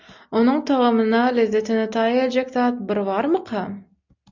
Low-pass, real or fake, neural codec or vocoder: 7.2 kHz; real; none